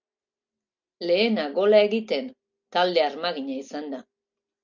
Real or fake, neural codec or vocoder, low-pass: real; none; 7.2 kHz